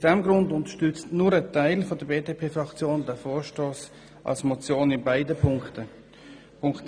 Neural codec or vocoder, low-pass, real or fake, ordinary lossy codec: none; 9.9 kHz; real; none